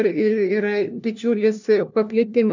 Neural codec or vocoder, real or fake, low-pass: codec, 16 kHz, 1 kbps, FunCodec, trained on LibriTTS, 50 frames a second; fake; 7.2 kHz